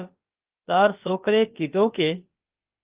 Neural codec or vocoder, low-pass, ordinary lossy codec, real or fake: codec, 16 kHz, about 1 kbps, DyCAST, with the encoder's durations; 3.6 kHz; Opus, 32 kbps; fake